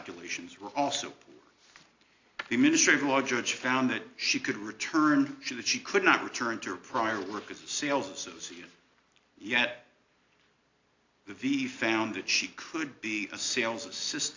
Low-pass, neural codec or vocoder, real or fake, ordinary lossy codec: 7.2 kHz; none; real; AAC, 48 kbps